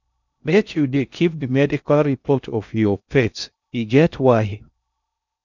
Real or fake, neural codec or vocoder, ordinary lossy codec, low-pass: fake; codec, 16 kHz in and 24 kHz out, 0.6 kbps, FocalCodec, streaming, 2048 codes; none; 7.2 kHz